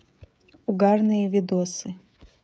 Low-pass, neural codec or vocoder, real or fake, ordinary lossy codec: none; codec, 16 kHz, 16 kbps, FreqCodec, smaller model; fake; none